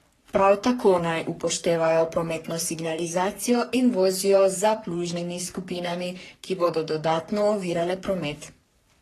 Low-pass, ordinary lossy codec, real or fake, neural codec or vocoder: 14.4 kHz; AAC, 48 kbps; fake; codec, 44.1 kHz, 3.4 kbps, Pupu-Codec